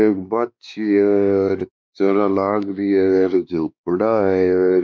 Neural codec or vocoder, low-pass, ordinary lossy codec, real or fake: codec, 16 kHz, 2 kbps, X-Codec, WavLM features, trained on Multilingual LibriSpeech; none; none; fake